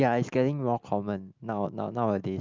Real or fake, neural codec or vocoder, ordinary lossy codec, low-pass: real; none; Opus, 24 kbps; 7.2 kHz